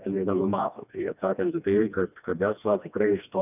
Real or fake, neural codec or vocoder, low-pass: fake; codec, 16 kHz, 1 kbps, FreqCodec, smaller model; 3.6 kHz